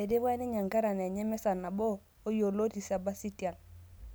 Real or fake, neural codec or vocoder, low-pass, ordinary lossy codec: real; none; none; none